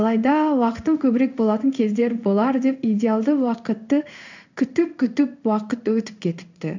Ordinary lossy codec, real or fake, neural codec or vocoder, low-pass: none; fake; codec, 16 kHz in and 24 kHz out, 1 kbps, XY-Tokenizer; 7.2 kHz